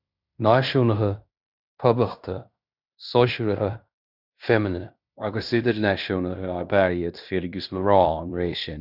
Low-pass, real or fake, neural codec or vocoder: 5.4 kHz; fake; codec, 16 kHz in and 24 kHz out, 0.9 kbps, LongCat-Audio-Codec, fine tuned four codebook decoder